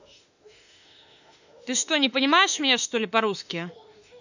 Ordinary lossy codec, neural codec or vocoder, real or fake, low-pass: none; autoencoder, 48 kHz, 32 numbers a frame, DAC-VAE, trained on Japanese speech; fake; 7.2 kHz